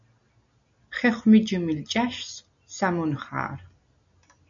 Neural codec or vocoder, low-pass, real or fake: none; 7.2 kHz; real